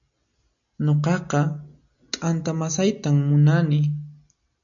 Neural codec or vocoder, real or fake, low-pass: none; real; 7.2 kHz